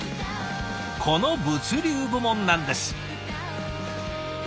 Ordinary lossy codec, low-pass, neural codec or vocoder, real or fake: none; none; none; real